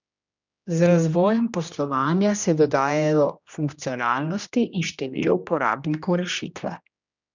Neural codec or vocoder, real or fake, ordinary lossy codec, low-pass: codec, 16 kHz, 1 kbps, X-Codec, HuBERT features, trained on general audio; fake; none; 7.2 kHz